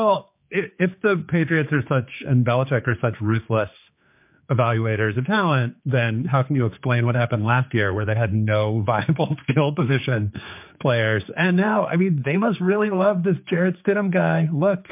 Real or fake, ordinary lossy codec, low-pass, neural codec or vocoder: fake; MP3, 32 kbps; 3.6 kHz; codec, 16 kHz, 4 kbps, X-Codec, HuBERT features, trained on general audio